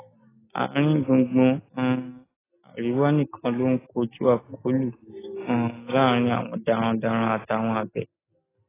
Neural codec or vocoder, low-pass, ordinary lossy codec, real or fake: none; 3.6 kHz; AAC, 16 kbps; real